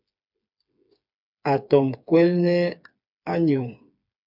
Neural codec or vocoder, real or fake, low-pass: codec, 16 kHz, 4 kbps, FreqCodec, smaller model; fake; 5.4 kHz